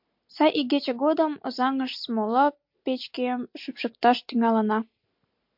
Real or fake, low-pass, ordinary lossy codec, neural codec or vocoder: real; 5.4 kHz; MP3, 48 kbps; none